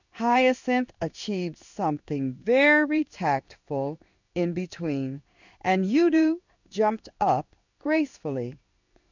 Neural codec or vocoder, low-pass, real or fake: codec, 16 kHz in and 24 kHz out, 1 kbps, XY-Tokenizer; 7.2 kHz; fake